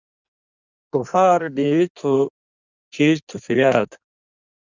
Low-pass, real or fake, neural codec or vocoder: 7.2 kHz; fake; codec, 16 kHz in and 24 kHz out, 1.1 kbps, FireRedTTS-2 codec